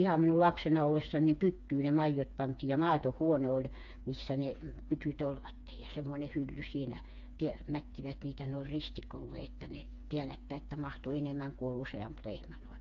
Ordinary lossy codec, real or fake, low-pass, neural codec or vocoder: AAC, 64 kbps; fake; 7.2 kHz; codec, 16 kHz, 4 kbps, FreqCodec, smaller model